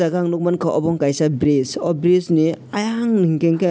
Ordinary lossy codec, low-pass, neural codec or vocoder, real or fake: none; none; none; real